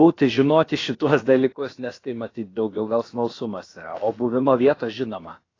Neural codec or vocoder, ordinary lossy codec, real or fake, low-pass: codec, 16 kHz, about 1 kbps, DyCAST, with the encoder's durations; AAC, 32 kbps; fake; 7.2 kHz